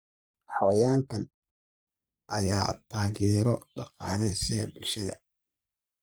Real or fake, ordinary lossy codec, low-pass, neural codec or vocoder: fake; none; none; codec, 44.1 kHz, 3.4 kbps, Pupu-Codec